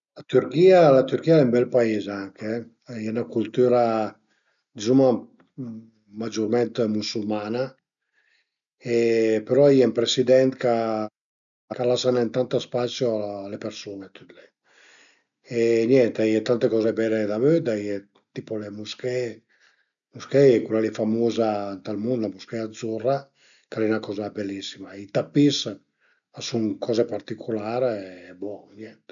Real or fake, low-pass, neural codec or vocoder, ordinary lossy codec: real; 7.2 kHz; none; none